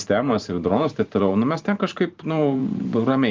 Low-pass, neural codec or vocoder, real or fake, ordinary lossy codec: 7.2 kHz; vocoder, 44.1 kHz, 128 mel bands every 512 samples, BigVGAN v2; fake; Opus, 32 kbps